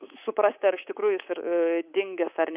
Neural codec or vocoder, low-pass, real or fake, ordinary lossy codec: codec, 24 kHz, 3.1 kbps, DualCodec; 3.6 kHz; fake; Opus, 64 kbps